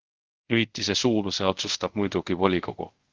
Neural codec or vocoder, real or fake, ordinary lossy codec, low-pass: codec, 24 kHz, 0.5 kbps, DualCodec; fake; Opus, 16 kbps; 7.2 kHz